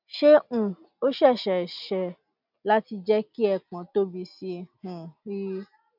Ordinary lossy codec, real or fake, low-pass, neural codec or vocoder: none; real; 5.4 kHz; none